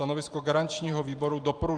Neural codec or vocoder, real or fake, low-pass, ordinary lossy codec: vocoder, 44.1 kHz, 128 mel bands every 512 samples, BigVGAN v2; fake; 9.9 kHz; Opus, 32 kbps